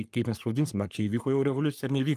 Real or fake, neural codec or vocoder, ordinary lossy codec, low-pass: fake; codec, 44.1 kHz, 3.4 kbps, Pupu-Codec; Opus, 32 kbps; 14.4 kHz